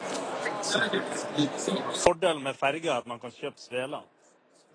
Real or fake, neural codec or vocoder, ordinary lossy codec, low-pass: real; none; AAC, 32 kbps; 9.9 kHz